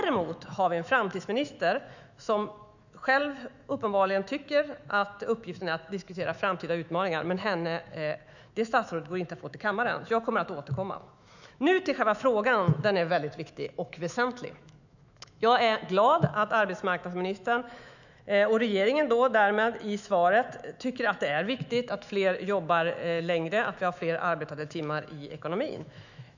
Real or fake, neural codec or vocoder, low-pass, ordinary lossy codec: fake; autoencoder, 48 kHz, 128 numbers a frame, DAC-VAE, trained on Japanese speech; 7.2 kHz; none